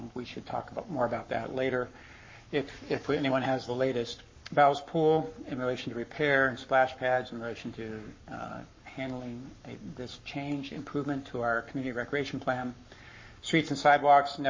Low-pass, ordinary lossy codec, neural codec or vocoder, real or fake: 7.2 kHz; MP3, 32 kbps; codec, 44.1 kHz, 7.8 kbps, Pupu-Codec; fake